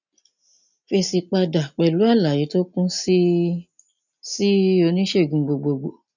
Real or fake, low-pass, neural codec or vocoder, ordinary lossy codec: real; 7.2 kHz; none; none